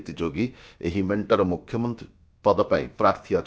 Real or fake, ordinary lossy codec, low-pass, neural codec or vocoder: fake; none; none; codec, 16 kHz, about 1 kbps, DyCAST, with the encoder's durations